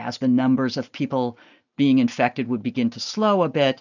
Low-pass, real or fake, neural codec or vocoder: 7.2 kHz; real; none